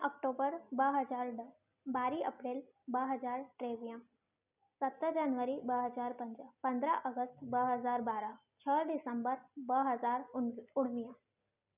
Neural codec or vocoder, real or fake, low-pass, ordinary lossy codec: none; real; 3.6 kHz; none